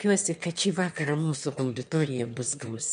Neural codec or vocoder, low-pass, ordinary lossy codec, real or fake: autoencoder, 22.05 kHz, a latent of 192 numbers a frame, VITS, trained on one speaker; 9.9 kHz; MP3, 64 kbps; fake